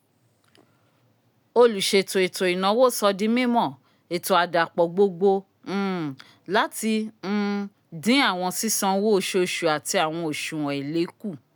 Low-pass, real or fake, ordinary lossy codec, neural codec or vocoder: none; real; none; none